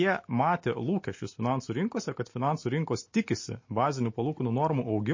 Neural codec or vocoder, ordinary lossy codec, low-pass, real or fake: none; MP3, 32 kbps; 7.2 kHz; real